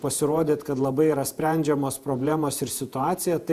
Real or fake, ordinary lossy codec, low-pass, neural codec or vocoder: fake; Opus, 64 kbps; 14.4 kHz; vocoder, 48 kHz, 128 mel bands, Vocos